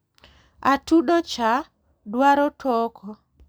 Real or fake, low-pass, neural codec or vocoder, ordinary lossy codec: real; none; none; none